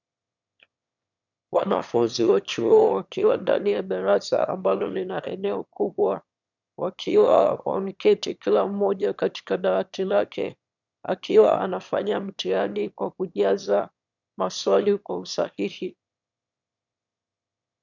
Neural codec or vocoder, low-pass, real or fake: autoencoder, 22.05 kHz, a latent of 192 numbers a frame, VITS, trained on one speaker; 7.2 kHz; fake